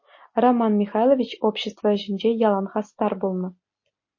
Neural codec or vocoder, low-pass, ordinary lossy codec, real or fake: none; 7.2 kHz; MP3, 32 kbps; real